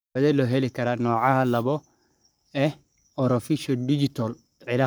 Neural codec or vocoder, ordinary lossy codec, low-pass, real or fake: codec, 44.1 kHz, 7.8 kbps, Pupu-Codec; none; none; fake